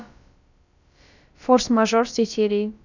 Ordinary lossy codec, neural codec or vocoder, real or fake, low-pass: none; codec, 16 kHz, about 1 kbps, DyCAST, with the encoder's durations; fake; 7.2 kHz